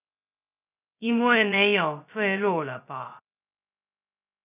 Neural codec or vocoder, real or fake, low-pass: codec, 16 kHz, 0.2 kbps, FocalCodec; fake; 3.6 kHz